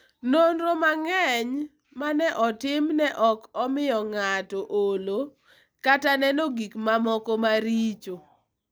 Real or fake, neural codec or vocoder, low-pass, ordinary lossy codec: real; none; none; none